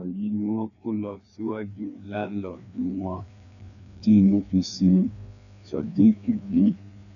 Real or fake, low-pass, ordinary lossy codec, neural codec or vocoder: fake; 7.2 kHz; none; codec, 16 kHz, 2 kbps, FreqCodec, larger model